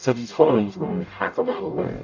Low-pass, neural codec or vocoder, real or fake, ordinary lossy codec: 7.2 kHz; codec, 44.1 kHz, 0.9 kbps, DAC; fake; AAC, 48 kbps